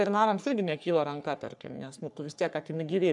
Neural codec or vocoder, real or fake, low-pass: codec, 44.1 kHz, 3.4 kbps, Pupu-Codec; fake; 10.8 kHz